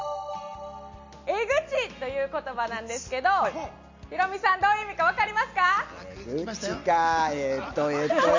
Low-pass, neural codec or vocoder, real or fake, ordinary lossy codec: 7.2 kHz; none; real; MP3, 48 kbps